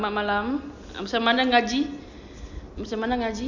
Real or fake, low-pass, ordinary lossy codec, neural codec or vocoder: real; 7.2 kHz; none; none